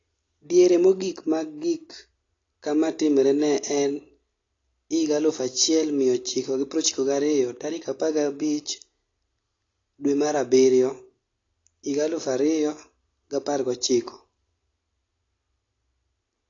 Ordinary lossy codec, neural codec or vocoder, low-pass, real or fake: AAC, 32 kbps; none; 7.2 kHz; real